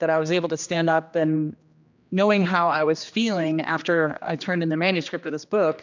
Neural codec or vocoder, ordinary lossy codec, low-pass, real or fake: codec, 16 kHz, 2 kbps, X-Codec, HuBERT features, trained on general audio; MP3, 64 kbps; 7.2 kHz; fake